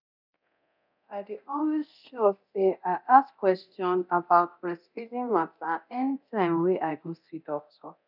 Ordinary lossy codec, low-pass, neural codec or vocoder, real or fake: none; 5.4 kHz; codec, 24 kHz, 0.9 kbps, DualCodec; fake